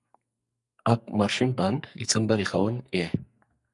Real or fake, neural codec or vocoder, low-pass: fake; codec, 44.1 kHz, 2.6 kbps, SNAC; 10.8 kHz